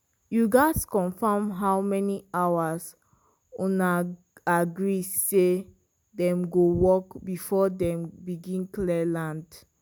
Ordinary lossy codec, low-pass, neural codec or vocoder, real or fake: none; none; none; real